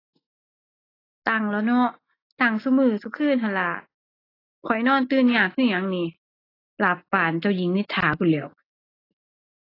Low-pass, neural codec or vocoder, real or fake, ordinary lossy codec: 5.4 kHz; none; real; AAC, 24 kbps